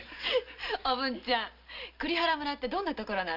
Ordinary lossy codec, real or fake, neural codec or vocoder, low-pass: none; real; none; 5.4 kHz